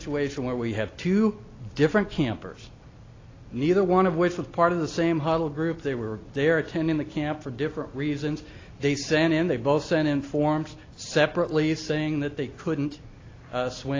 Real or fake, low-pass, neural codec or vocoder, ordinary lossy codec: real; 7.2 kHz; none; AAC, 32 kbps